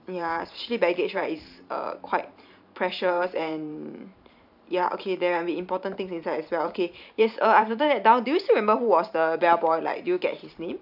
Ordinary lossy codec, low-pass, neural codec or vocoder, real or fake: none; 5.4 kHz; vocoder, 44.1 kHz, 128 mel bands every 512 samples, BigVGAN v2; fake